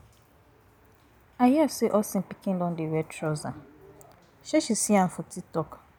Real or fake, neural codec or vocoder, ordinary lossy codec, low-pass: real; none; none; none